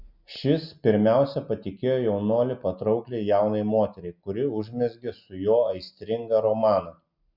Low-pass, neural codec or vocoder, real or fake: 5.4 kHz; none; real